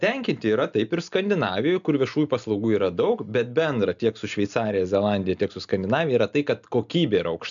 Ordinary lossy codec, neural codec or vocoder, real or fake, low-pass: MP3, 96 kbps; none; real; 7.2 kHz